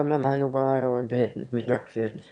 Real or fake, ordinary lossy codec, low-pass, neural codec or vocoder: fake; none; 9.9 kHz; autoencoder, 22.05 kHz, a latent of 192 numbers a frame, VITS, trained on one speaker